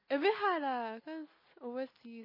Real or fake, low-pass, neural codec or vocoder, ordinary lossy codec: real; 5.4 kHz; none; MP3, 24 kbps